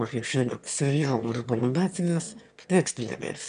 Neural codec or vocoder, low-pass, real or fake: autoencoder, 22.05 kHz, a latent of 192 numbers a frame, VITS, trained on one speaker; 9.9 kHz; fake